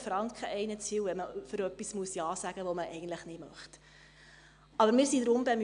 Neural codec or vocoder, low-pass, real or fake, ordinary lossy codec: none; 9.9 kHz; real; AAC, 96 kbps